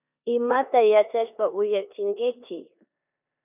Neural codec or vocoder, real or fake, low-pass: codec, 16 kHz in and 24 kHz out, 0.9 kbps, LongCat-Audio-Codec, four codebook decoder; fake; 3.6 kHz